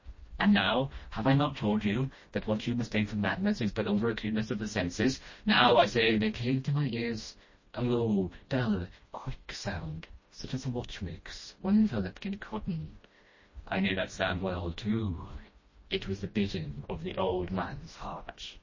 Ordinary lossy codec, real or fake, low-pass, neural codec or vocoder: MP3, 32 kbps; fake; 7.2 kHz; codec, 16 kHz, 1 kbps, FreqCodec, smaller model